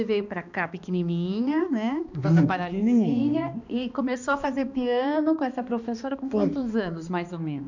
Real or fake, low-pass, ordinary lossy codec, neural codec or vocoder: fake; 7.2 kHz; none; codec, 16 kHz, 2 kbps, X-Codec, HuBERT features, trained on balanced general audio